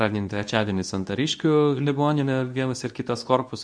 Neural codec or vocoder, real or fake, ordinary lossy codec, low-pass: codec, 24 kHz, 0.9 kbps, WavTokenizer, medium speech release version 2; fake; MP3, 96 kbps; 9.9 kHz